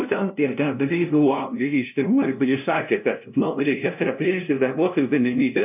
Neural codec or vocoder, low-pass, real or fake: codec, 16 kHz, 0.5 kbps, FunCodec, trained on LibriTTS, 25 frames a second; 3.6 kHz; fake